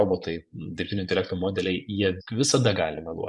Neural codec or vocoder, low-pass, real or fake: vocoder, 24 kHz, 100 mel bands, Vocos; 10.8 kHz; fake